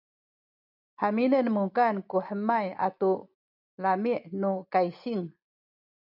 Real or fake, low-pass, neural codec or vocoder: real; 5.4 kHz; none